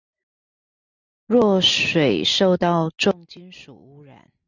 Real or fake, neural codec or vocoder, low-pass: real; none; 7.2 kHz